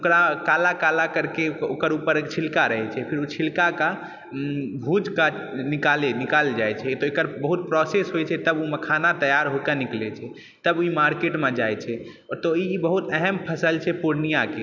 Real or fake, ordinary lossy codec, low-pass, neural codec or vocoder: real; none; 7.2 kHz; none